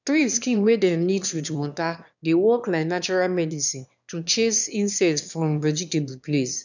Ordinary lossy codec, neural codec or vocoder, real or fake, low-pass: none; autoencoder, 22.05 kHz, a latent of 192 numbers a frame, VITS, trained on one speaker; fake; 7.2 kHz